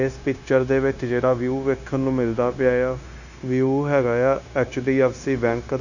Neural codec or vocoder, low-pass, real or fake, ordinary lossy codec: codec, 16 kHz, 0.9 kbps, LongCat-Audio-Codec; 7.2 kHz; fake; none